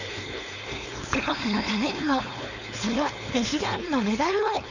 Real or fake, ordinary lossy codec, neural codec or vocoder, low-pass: fake; none; codec, 16 kHz, 4.8 kbps, FACodec; 7.2 kHz